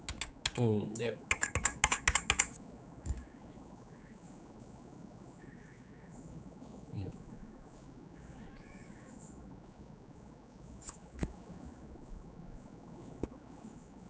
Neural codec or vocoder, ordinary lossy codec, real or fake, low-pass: codec, 16 kHz, 4 kbps, X-Codec, HuBERT features, trained on general audio; none; fake; none